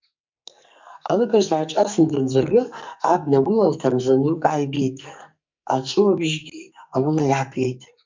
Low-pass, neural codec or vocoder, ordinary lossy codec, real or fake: 7.2 kHz; codec, 44.1 kHz, 2.6 kbps, SNAC; MP3, 64 kbps; fake